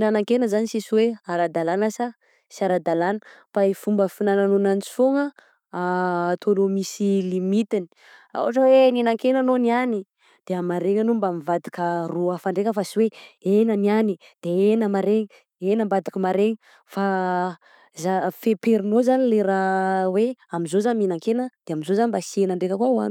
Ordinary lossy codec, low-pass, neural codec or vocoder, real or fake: none; 19.8 kHz; none; real